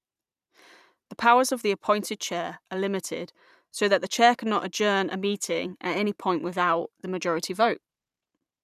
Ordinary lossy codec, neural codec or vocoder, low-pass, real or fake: none; vocoder, 44.1 kHz, 128 mel bands every 512 samples, BigVGAN v2; 14.4 kHz; fake